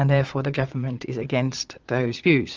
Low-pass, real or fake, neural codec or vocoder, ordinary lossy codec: 7.2 kHz; fake; vocoder, 22.05 kHz, 80 mel bands, Vocos; Opus, 24 kbps